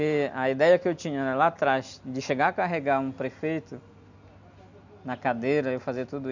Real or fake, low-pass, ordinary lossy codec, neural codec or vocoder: real; 7.2 kHz; none; none